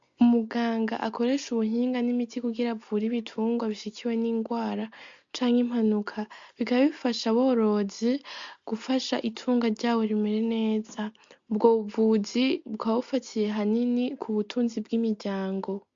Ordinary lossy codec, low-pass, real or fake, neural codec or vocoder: MP3, 48 kbps; 7.2 kHz; real; none